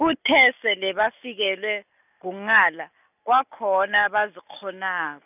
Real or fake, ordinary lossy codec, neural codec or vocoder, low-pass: real; none; none; 3.6 kHz